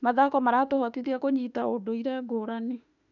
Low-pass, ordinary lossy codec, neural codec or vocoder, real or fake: 7.2 kHz; none; autoencoder, 48 kHz, 32 numbers a frame, DAC-VAE, trained on Japanese speech; fake